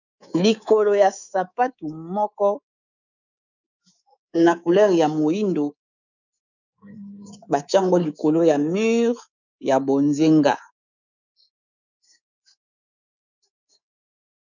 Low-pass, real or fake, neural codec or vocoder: 7.2 kHz; fake; codec, 24 kHz, 3.1 kbps, DualCodec